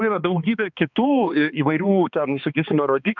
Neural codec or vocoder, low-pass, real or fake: codec, 16 kHz, 2 kbps, X-Codec, HuBERT features, trained on balanced general audio; 7.2 kHz; fake